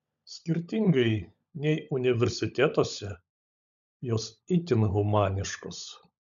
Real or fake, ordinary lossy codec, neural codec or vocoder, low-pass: fake; MP3, 96 kbps; codec, 16 kHz, 16 kbps, FunCodec, trained on LibriTTS, 50 frames a second; 7.2 kHz